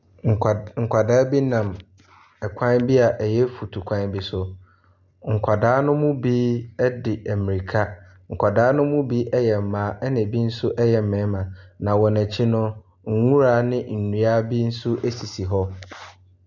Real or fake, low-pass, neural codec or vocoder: real; 7.2 kHz; none